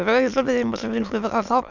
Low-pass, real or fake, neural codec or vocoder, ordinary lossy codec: 7.2 kHz; fake; autoencoder, 22.05 kHz, a latent of 192 numbers a frame, VITS, trained on many speakers; none